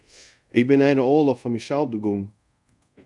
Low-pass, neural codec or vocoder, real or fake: 10.8 kHz; codec, 24 kHz, 0.5 kbps, DualCodec; fake